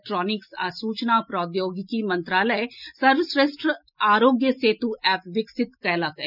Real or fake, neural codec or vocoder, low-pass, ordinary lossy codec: real; none; 5.4 kHz; none